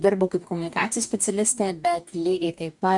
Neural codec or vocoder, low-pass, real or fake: codec, 44.1 kHz, 2.6 kbps, DAC; 10.8 kHz; fake